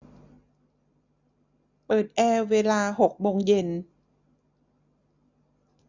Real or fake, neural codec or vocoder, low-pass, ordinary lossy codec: real; none; 7.2 kHz; none